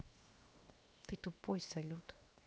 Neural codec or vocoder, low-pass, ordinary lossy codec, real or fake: codec, 16 kHz, 0.7 kbps, FocalCodec; none; none; fake